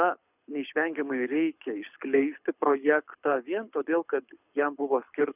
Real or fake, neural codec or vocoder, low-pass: fake; codec, 24 kHz, 6 kbps, HILCodec; 3.6 kHz